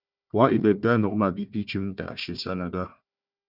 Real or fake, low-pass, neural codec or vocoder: fake; 5.4 kHz; codec, 16 kHz, 1 kbps, FunCodec, trained on Chinese and English, 50 frames a second